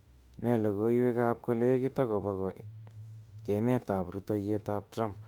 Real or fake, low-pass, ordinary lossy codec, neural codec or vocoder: fake; 19.8 kHz; Opus, 64 kbps; autoencoder, 48 kHz, 32 numbers a frame, DAC-VAE, trained on Japanese speech